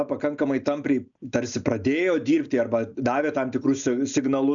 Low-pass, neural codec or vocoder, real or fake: 7.2 kHz; none; real